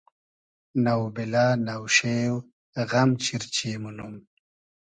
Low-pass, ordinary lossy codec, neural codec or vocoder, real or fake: 9.9 kHz; Opus, 64 kbps; none; real